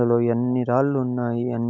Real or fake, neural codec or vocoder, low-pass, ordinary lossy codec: real; none; 7.2 kHz; none